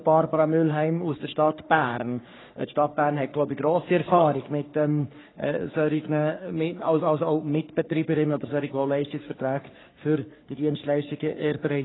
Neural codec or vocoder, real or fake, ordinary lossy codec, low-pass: codec, 44.1 kHz, 3.4 kbps, Pupu-Codec; fake; AAC, 16 kbps; 7.2 kHz